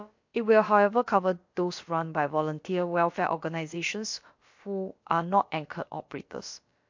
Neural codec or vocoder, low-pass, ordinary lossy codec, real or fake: codec, 16 kHz, about 1 kbps, DyCAST, with the encoder's durations; 7.2 kHz; MP3, 48 kbps; fake